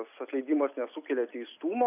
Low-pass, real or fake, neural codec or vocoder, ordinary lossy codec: 3.6 kHz; real; none; AAC, 24 kbps